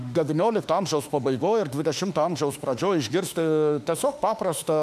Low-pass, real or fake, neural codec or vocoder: 14.4 kHz; fake; autoencoder, 48 kHz, 32 numbers a frame, DAC-VAE, trained on Japanese speech